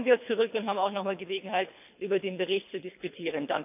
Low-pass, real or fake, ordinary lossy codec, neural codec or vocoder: 3.6 kHz; fake; none; codec, 24 kHz, 3 kbps, HILCodec